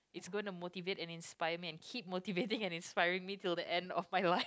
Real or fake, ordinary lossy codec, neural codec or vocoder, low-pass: real; none; none; none